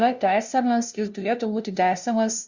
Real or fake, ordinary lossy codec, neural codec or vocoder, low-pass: fake; Opus, 64 kbps; codec, 16 kHz, 0.5 kbps, FunCodec, trained on LibriTTS, 25 frames a second; 7.2 kHz